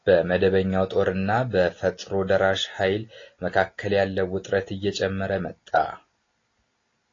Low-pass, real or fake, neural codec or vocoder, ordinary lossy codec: 7.2 kHz; real; none; AAC, 32 kbps